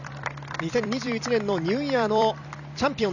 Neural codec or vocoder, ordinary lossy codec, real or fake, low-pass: vocoder, 44.1 kHz, 128 mel bands every 256 samples, BigVGAN v2; none; fake; 7.2 kHz